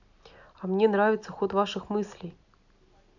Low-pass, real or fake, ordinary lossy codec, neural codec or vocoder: 7.2 kHz; real; none; none